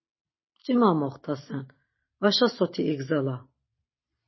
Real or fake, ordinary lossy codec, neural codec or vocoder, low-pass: real; MP3, 24 kbps; none; 7.2 kHz